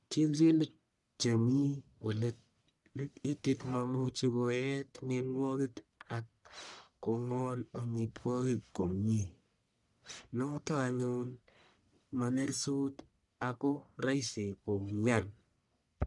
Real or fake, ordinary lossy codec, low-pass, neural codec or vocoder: fake; none; 10.8 kHz; codec, 44.1 kHz, 1.7 kbps, Pupu-Codec